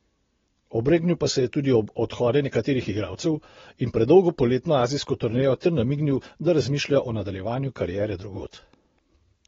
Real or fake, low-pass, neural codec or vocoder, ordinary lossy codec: real; 7.2 kHz; none; AAC, 24 kbps